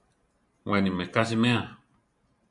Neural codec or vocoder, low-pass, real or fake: vocoder, 44.1 kHz, 128 mel bands every 512 samples, BigVGAN v2; 10.8 kHz; fake